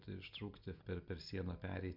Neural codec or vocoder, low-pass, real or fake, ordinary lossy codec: none; 5.4 kHz; real; MP3, 48 kbps